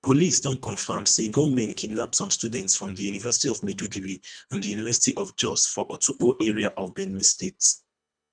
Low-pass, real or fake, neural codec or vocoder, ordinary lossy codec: 9.9 kHz; fake; codec, 24 kHz, 1.5 kbps, HILCodec; none